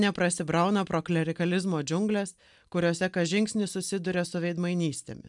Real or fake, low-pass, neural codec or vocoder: real; 10.8 kHz; none